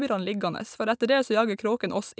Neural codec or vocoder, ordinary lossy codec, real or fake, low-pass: none; none; real; none